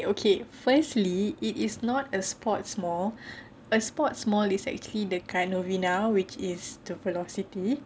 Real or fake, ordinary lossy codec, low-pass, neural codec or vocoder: real; none; none; none